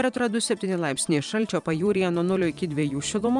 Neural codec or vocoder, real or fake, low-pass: vocoder, 44.1 kHz, 128 mel bands every 512 samples, BigVGAN v2; fake; 10.8 kHz